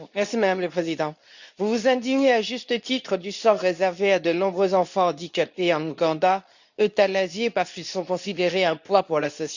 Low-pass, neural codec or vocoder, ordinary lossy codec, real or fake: 7.2 kHz; codec, 24 kHz, 0.9 kbps, WavTokenizer, medium speech release version 2; none; fake